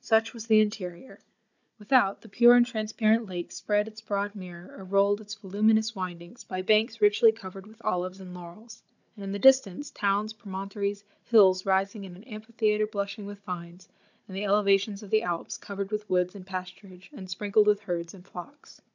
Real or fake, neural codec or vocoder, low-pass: fake; codec, 16 kHz, 16 kbps, FunCodec, trained on Chinese and English, 50 frames a second; 7.2 kHz